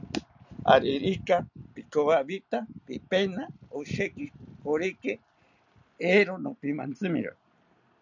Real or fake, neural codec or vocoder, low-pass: real; none; 7.2 kHz